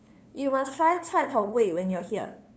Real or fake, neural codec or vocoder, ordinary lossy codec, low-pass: fake; codec, 16 kHz, 2 kbps, FunCodec, trained on LibriTTS, 25 frames a second; none; none